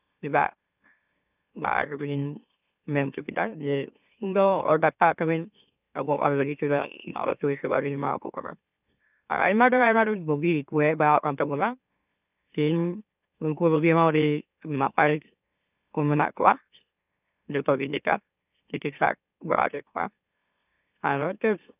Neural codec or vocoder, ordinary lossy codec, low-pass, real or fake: autoencoder, 44.1 kHz, a latent of 192 numbers a frame, MeloTTS; none; 3.6 kHz; fake